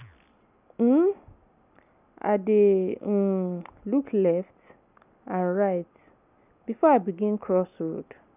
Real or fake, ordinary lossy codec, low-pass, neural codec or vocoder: real; none; 3.6 kHz; none